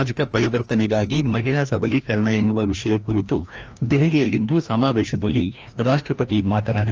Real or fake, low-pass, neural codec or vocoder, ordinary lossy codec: fake; 7.2 kHz; codec, 16 kHz, 1 kbps, FreqCodec, larger model; Opus, 24 kbps